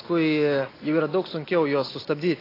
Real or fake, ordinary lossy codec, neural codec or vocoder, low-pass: real; AAC, 24 kbps; none; 5.4 kHz